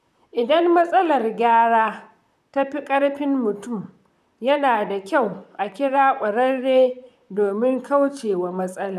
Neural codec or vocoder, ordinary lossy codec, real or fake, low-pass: vocoder, 44.1 kHz, 128 mel bands, Pupu-Vocoder; none; fake; 14.4 kHz